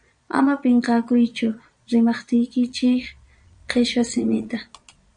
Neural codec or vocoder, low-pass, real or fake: vocoder, 22.05 kHz, 80 mel bands, Vocos; 9.9 kHz; fake